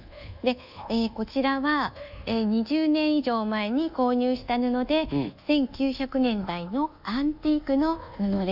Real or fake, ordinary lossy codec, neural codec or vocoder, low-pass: fake; none; codec, 24 kHz, 1.2 kbps, DualCodec; 5.4 kHz